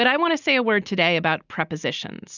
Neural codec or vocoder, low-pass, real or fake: none; 7.2 kHz; real